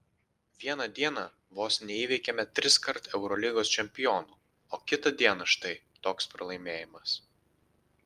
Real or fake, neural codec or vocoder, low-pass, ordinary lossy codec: real; none; 14.4 kHz; Opus, 32 kbps